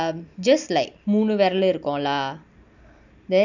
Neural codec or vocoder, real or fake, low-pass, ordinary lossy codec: none; real; 7.2 kHz; none